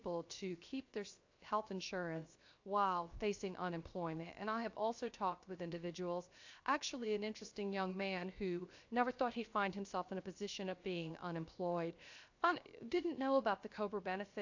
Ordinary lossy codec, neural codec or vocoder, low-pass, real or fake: AAC, 48 kbps; codec, 16 kHz, 0.7 kbps, FocalCodec; 7.2 kHz; fake